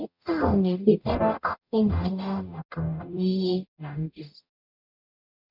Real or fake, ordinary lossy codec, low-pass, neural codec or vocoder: fake; none; 5.4 kHz; codec, 44.1 kHz, 0.9 kbps, DAC